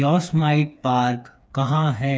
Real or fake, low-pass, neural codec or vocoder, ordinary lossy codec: fake; none; codec, 16 kHz, 4 kbps, FreqCodec, smaller model; none